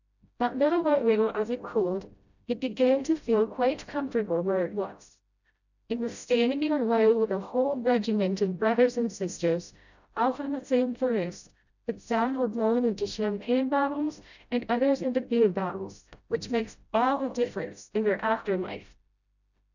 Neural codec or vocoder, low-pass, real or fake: codec, 16 kHz, 0.5 kbps, FreqCodec, smaller model; 7.2 kHz; fake